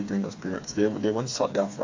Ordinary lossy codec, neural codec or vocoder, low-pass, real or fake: AAC, 48 kbps; codec, 16 kHz, 4 kbps, FreqCodec, smaller model; 7.2 kHz; fake